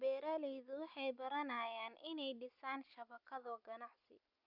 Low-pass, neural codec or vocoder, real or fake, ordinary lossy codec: 5.4 kHz; none; real; none